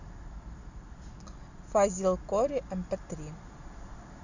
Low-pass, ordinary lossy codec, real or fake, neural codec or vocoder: 7.2 kHz; Opus, 64 kbps; real; none